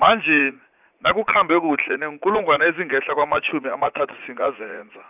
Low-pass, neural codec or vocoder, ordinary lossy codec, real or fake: 3.6 kHz; vocoder, 44.1 kHz, 80 mel bands, Vocos; none; fake